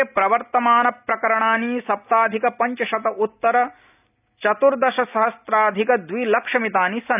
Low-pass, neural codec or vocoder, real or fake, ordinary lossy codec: 3.6 kHz; none; real; none